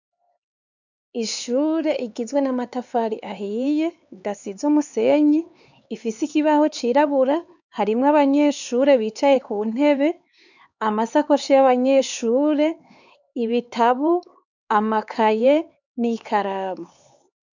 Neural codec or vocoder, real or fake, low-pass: codec, 16 kHz, 4 kbps, X-Codec, HuBERT features, trained on LibriSpeech; fake; 7.2 kHz